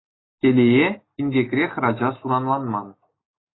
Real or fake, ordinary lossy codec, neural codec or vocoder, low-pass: real; AAC, 16 kbps; none; 7.2 kHz